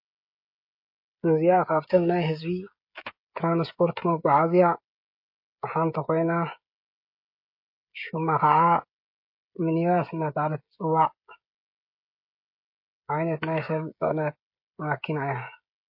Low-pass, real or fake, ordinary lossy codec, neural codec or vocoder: 5.4 kHz; fake; MP3, 32 kbps; codec, 16 kHz, 16 kbps, FreqCodec, smaller model